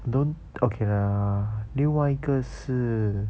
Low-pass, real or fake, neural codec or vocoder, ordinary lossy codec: none; real; none; none